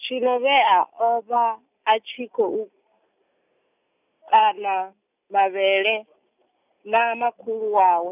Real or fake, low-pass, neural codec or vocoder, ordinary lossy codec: real; 3.6 kHz; none; none